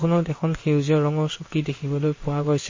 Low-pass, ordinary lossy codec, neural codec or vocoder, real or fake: 7.2 kHz; MP3, 32 kbps; codec, 16 kHz in and 24 kHz out, 1 kbps, XY-Tokenizer; fake